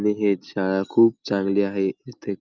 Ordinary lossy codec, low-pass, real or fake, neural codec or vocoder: Opus, 32 kbps; 7.2 kHz; real; none